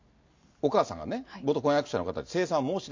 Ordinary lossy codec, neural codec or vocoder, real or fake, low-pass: MP3, 48 kbps; none; real; 7.2 kHz